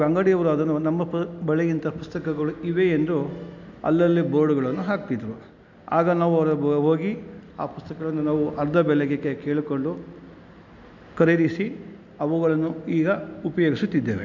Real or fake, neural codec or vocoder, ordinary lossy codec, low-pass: real; none; none; 7.2 kHz